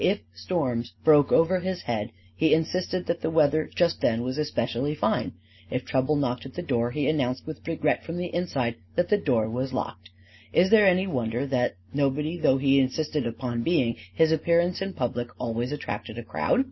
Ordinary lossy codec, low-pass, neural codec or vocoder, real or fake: MP3, 24 kbps; 7.2 kHz; none; real